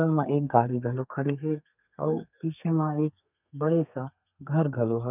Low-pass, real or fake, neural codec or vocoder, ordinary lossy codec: 3.6 kHz; fake; codec, 32 kHz, 1.9 kbps, SNAC; none